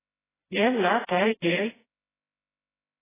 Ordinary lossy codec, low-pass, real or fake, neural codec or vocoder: AAC, 16 kbps; 3.6 kHz; fake; codec, 16 kHz, 0.5 kbps, FreqCodec, smaller model